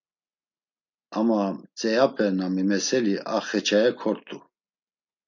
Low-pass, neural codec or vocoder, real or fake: 7.2 kHz; none; real